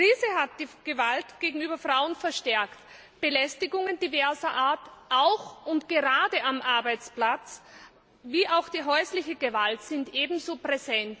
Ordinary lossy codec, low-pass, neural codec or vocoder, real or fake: none; none; none; real